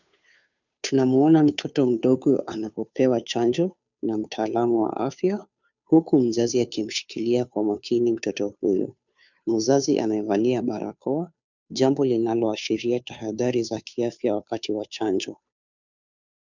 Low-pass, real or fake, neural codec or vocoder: 7.2 kHz; fake; codec, 16 kHz, 2 kbps, FunCodec, trained on Chinese and English, 25 frames a second